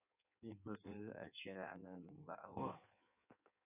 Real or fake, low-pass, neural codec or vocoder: fake; 3.6 kHz; codec, 16 kHz in and 24 kHz out, 1.1 kbps, FireRedTTS-2 codec